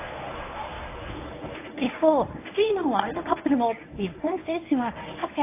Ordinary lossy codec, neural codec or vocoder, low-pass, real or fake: AAC, 24 kbps; codec, 24 kHz, 0.9 kbps, WavTokenizer, medium speech release version 1; 3.6 kHz; fake